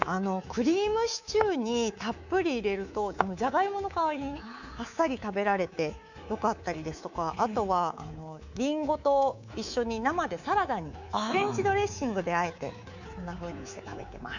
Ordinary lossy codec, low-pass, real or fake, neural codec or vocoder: none; 7.2 kHz; fake; codec, 24 kHz, 3.1 kbps, DualCodec